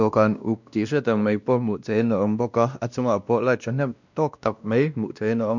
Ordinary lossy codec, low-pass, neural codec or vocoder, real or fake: none; 7.2 kHz; codec, 16 kHz, 1 kbps, X-Codec, WavLM features, trained on Multilingual LibriSpeech; fake